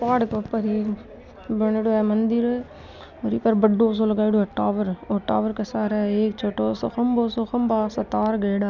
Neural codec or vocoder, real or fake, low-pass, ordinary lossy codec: none; real; 7.2 kHz; none